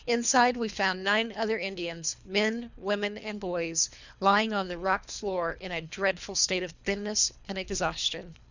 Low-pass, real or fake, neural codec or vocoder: 7.2 kHz; fake; codec, 24 kHz, 3 kbps, HILCodec